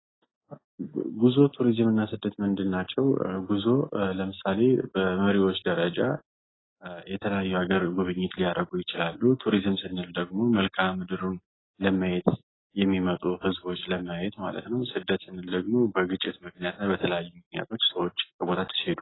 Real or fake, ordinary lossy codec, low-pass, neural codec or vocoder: real; AAC, 16 kbps; 7.2 kHz; none